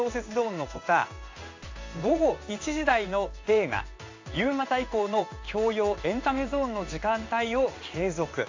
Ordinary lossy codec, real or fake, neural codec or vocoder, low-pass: AAC, 48 kbps; fake; codec, 16 kHz in and 24 kHz out, 1 kbps, XY-Tokenizer; 7.2 kHz